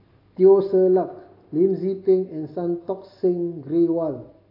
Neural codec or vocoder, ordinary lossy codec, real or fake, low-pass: none; AAC, 32 kbps; real; 5.4 kHz